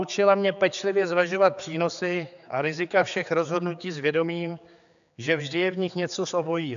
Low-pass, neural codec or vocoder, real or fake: 7.2 kHz; codec, 16 kHz, 4 kbps, X-Codec, HuBERT features, trained on general audio; fake